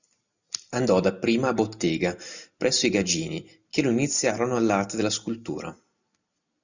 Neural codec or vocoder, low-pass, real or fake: none; 7.2 kHz; real